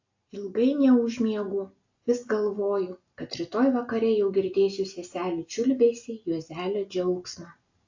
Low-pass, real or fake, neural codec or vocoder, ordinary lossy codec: 7.2 kHz; real; none; AAC, 48 kbps